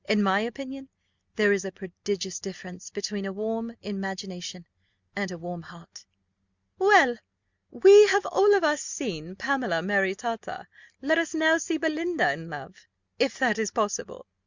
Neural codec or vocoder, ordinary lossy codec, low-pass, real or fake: none; Opus, 64 kbps; 7.2 kHz; real